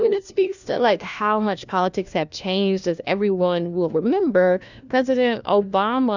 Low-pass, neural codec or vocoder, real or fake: 7.2 kHz; codec, 16 kHz, 1 kbps, FunCodec, trained on LibriTTS, 50 frames a second; fake